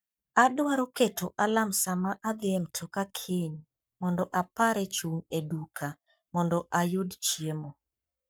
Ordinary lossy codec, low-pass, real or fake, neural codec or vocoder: none; none; fake; codec, 44.1 kHz, 7.8 kbps, Pupu-Codec